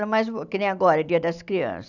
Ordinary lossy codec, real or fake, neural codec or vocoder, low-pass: none; real; none; 7.2 kHz